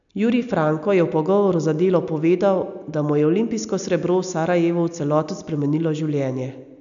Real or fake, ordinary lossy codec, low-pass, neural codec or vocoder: real; none; 7.2 kHz; none